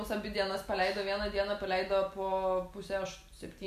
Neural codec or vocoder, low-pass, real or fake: none; 14.4 kHz; real